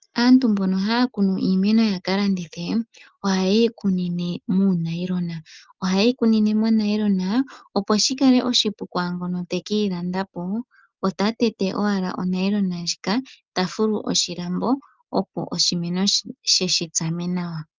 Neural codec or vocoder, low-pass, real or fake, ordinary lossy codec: none; 7.2 kHz; real; Opus, 32 kbps